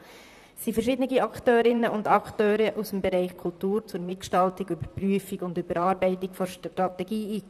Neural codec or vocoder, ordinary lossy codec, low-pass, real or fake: vocoder, 44.1 kHz, 128 mel bands, Pupu-Vocoder; none; 14.4 kHz; fake